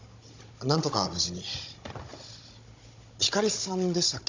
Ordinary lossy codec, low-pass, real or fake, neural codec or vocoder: MP3, 64 kbps; 7.2 kHz; fake; codec, 16 kHz, 16 kbps, FunCodec, trained on Chinese and English, 50 frames a second